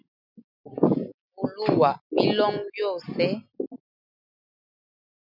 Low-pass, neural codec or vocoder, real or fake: 5.4 kHz; none; real